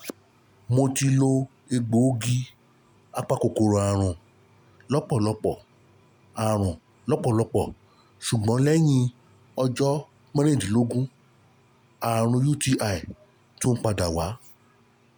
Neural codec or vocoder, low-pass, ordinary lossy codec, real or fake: none; none; none; real